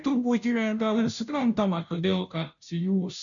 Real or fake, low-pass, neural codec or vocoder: fake; 7.2 kHz; codec, 16 kHz, 0.5 kbps, FunCodec, trained on Chinese and English, 25 frames a second